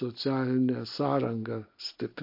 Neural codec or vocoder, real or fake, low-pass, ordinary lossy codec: none; real; 5.4 kHz; MP3, 32 kbps